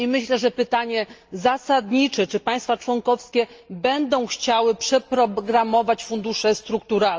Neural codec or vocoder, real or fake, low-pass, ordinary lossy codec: none; real; 7.2 kHz; Opus, 24 kbps